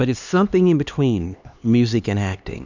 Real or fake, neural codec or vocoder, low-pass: fake; codec, 16 kHz, 2 kbps, X-Codec, HuBERT features, trained on LibriSpeech; 7.2 kHz